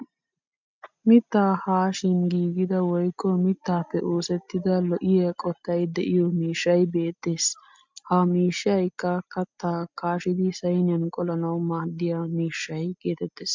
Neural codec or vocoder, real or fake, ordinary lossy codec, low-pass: none; real; MP3, 64 kbps; 7.2 kHz